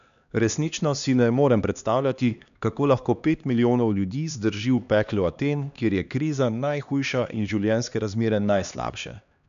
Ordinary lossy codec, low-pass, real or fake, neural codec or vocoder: none; 7.2 kHz; fake; codec, 16 kHz, 2 kbps, X-Codec, HuBERT features, trained on LibriSpeech